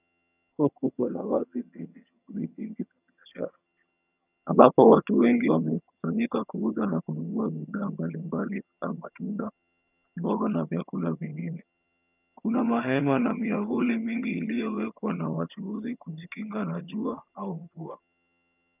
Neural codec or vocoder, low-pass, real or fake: vocoder, 22.05 kHz, 80 mel bands, HiFi-GAN; 3.6 kHz; fake